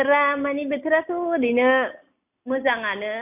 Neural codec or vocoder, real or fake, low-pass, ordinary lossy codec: none; real; 3.6 kHz; none